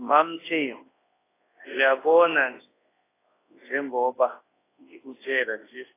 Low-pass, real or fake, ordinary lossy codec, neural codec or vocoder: 3.6 kHz; fake; AAC, 16 kbps; codec, 24 kHz, 0.9 kbps, WavTokenizer, large speech release